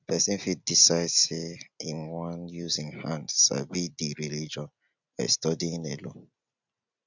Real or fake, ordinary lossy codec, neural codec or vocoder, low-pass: real; none; none; 7.2 kHz